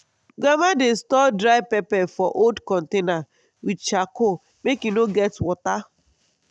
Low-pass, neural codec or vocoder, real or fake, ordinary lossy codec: none; none; real; none